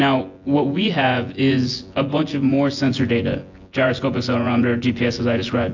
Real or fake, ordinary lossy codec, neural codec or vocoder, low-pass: fake; AAC, 48 kbps; vocoder, 24 kHz, 100 mel bands, Vocos; 7.2 kHz